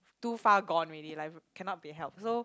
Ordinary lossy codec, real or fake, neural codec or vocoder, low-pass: none; real; none; none